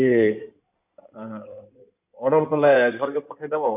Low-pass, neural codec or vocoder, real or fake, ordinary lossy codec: 3.6 kHz; codec, 16 kHz in and 24 kHz out, 2.2 kbps, FireRedTTS-2 codec; fake; none